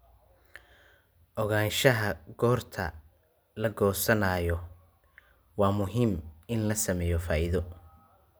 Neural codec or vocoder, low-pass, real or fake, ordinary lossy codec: none; none; real; none